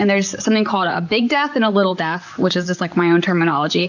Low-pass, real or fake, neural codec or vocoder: 7.2 kHz; fake; vocoder, 44.1 kHz, 128 mel bands, Pupu-Vocoder